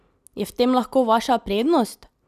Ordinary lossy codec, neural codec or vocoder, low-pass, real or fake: none; none; 14.4 kHz; real